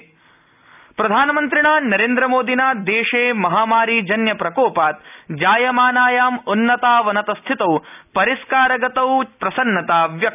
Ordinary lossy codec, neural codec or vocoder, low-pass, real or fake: none; none; 3.6 kHz; real